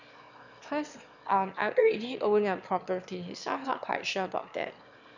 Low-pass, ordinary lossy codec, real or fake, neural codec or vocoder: 7.2 kHz; none; fake; autoencoder, 22.05 kHz, a latent of 192 numbers a frame, VITS, trained on one speaker